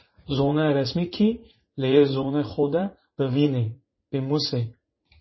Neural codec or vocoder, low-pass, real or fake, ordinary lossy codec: vocoder, 44.1 kHz, 80 mel bands, Vocos; 7.2 kHz; fake; MP3, 24 kbps